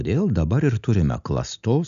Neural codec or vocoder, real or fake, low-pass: none; real; 7.2 kHz